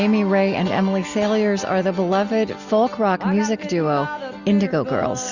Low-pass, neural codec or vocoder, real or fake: 7.2 kHz; none; real